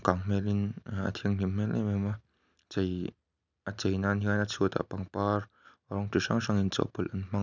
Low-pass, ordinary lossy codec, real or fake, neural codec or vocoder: 7.2 kHz; none; real; none